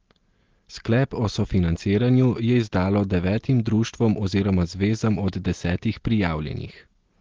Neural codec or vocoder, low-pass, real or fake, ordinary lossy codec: none; 7.2 kHz; real; Opus, 16 kbps